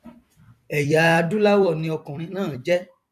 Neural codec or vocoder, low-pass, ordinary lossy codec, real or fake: vocoder, 44.1 kHz, 128 mel bands every 256 samples, BigVGAN v2; 14.4 kHz; none; fake